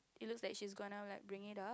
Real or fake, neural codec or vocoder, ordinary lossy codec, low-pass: real; none; none; none